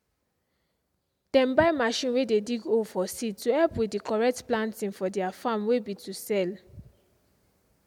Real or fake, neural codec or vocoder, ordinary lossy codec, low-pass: real; none; MP3, 96 kbps; 19.8 kHz